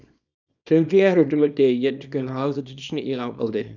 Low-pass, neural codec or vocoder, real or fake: 7.2 kHz; codec, 24 kHz, 0.9 kbps, WavTokenizer, small release; fake